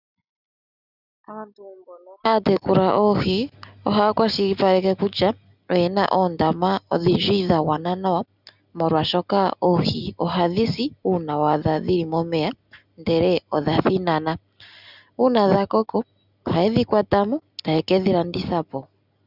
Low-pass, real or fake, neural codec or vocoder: 5.4 kHz; real; none